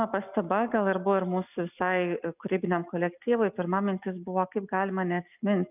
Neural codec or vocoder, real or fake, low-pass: none; real; 3.6 kHz